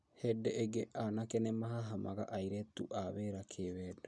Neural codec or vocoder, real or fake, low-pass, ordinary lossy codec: none; real; none; none